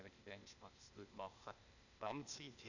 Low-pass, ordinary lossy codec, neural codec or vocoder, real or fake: 7.2 kHz; none; codec, 16 kHz, 0.8 kbps, ZipCodec; fake